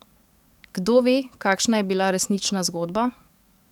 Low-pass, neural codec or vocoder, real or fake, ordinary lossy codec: 19.8 kHz; codec, 44.1 kHz, 7.8 kbps, DAC; fake; none